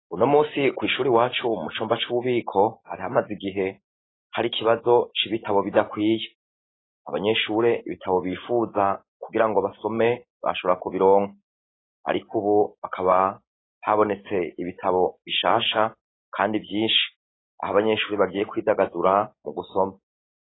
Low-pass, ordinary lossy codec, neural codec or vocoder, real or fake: 7.2 kHz; AAC, 16 kbps; none; real